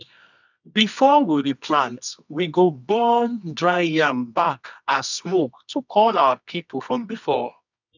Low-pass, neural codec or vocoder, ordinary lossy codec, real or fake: 7.2 kHz; codec, 24 kHz, 0.9 kbps, WavTokenizer, medium music audio release; none; fake